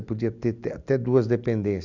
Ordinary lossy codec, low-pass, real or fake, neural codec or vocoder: none; 7.2 kHz; real; none